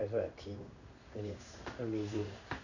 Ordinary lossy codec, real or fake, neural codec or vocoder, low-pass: none; fake; codec, 16 kHz in and 24 kHz out, 1 kbps, XY-Tokenizer; 7.2 kHz